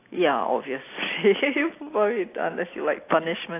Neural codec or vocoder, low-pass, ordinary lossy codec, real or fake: none; 3.6 kHz; AAC, 24 kbps; real